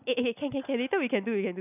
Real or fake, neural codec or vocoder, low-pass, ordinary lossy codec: real; none; 3.6 kHz; none